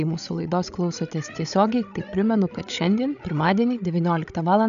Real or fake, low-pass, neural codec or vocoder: fake; 7.2 kHz; codec, 16 kHz, 16 kbps, FreqCodec, larger model